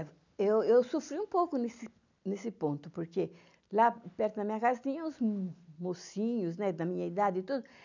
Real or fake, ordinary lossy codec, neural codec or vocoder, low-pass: real; none; none; 7.2 kHz